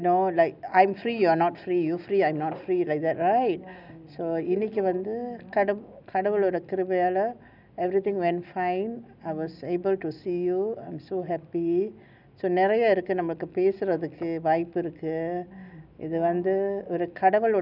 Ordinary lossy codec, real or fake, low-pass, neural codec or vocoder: none; real; 5.4 kHz; none